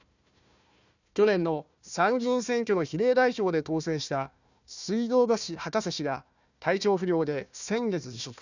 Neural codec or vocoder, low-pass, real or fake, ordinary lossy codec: codec, 16 kHz, 1 kbps, FunCodec, trained on Chinese and English, 50 frames a second; 7.2 kHz; fake; none